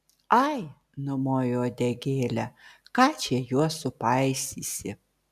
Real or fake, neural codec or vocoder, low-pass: real; none; 14.4 kHz